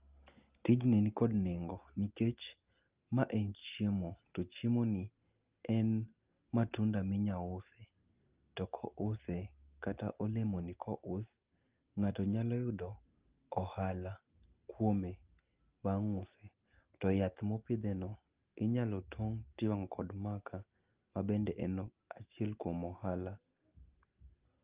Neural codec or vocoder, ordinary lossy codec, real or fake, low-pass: none; Opus, 32 kbps; real; 3.6 kHz